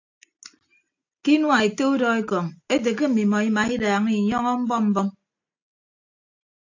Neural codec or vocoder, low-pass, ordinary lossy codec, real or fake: none; 7.2 kHz; AAC, 48 kbps; real